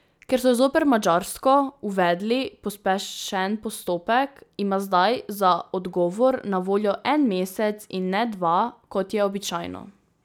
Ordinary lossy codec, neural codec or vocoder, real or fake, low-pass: none; none; real; none